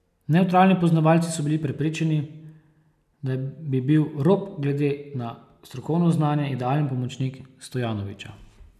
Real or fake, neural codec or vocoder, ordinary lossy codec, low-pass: real; none; none; 14.4 kHz